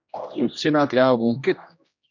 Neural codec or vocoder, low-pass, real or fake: codec, 16 kHz, 1 kbps, X-Codec, HuBERT features, trained on general audio; 7.2 kHz; fake